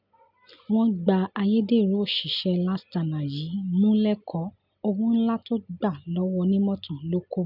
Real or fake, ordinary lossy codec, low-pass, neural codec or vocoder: real; none; 5.4 kHz; none